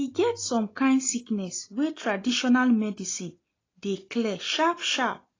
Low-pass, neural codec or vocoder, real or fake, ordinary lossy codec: 7.2 kHz; none; real; AAC, 32 kbps